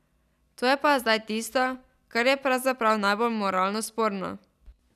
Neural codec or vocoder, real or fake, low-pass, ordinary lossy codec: none; real; 14.4 kHz; none